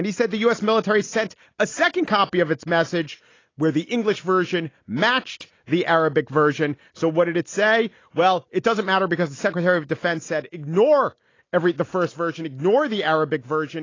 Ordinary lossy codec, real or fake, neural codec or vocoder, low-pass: AAC, 32 kbps; real; none; 7.2 kHz